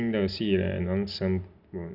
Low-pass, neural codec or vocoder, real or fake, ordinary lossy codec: 5.4 kHz; none; real; Opus, 64 kbps